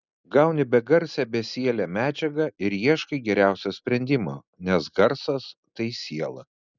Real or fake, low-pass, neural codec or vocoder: real; 7.2 kHz; none